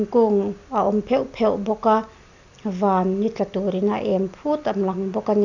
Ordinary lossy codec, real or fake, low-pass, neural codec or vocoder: none; real; 7.2 kHz; none